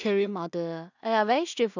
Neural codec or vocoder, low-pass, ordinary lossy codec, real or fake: codec, 16 kHz in and 24 kHz out, 0.4 kbps, LongCat-Audio-Codec, two codebook decoder; 7.2 kHz; none; fake